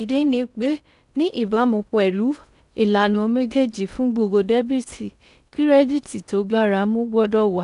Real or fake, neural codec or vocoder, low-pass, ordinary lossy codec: fake; codec, 16 kHz in and 24 kHz out, 0.6 kbps, FocalCodec, streaming, 4096 codes; 10.8 kHz; none